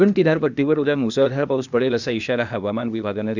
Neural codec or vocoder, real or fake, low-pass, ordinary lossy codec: codec, 16 kHz, 0.8 kbps, ZipCodec; fake; 7.2 kHz; none